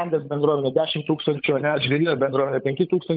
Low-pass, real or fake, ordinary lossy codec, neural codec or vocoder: 5.4 kHz; fake; Opus, 24 kbps; codec, 16 kHz, 16 kbps, FunCodec, trained on LibriTTS, 50 frames a second